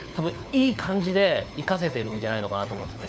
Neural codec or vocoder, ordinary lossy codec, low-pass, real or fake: codec, 16 kHz, 4 kbps, FunCodec, trained on LibriTTS, 50 frames a second; none; none; fake